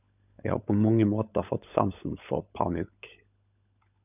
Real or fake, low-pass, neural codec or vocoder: fake; 3.6 kHz; codec, 16 kHz, 16 kbps, FunCodec, trained on LibriTTS, 50 frames a second